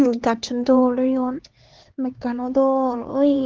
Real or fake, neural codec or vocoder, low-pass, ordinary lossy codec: fake; codec, 16 kHz, 4 kbps, X-Codec, HuBERT features, trained on LibriSpeech; 7.2 kHz; Opus, 16 kbps